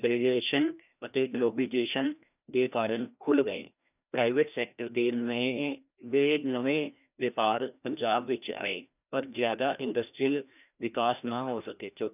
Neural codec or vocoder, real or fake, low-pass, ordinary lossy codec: codec, 16 kHz, 1 kbps, FreqCodec, larger model; fake; 3.6 kHz; none